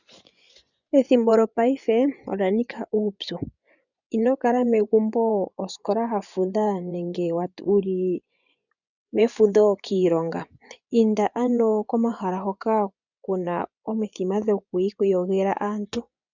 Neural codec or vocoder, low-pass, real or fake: vocoder, 24 kHz, 100 mel bands, Vocos; 7.2 kHz; fake